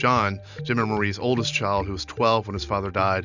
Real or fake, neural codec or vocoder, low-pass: real; none; 7.2 kHz